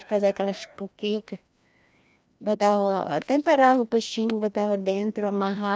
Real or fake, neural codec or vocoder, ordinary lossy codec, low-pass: fake; codec, 16 kHz, 1 kbps, FreqCodec, larger model; none; none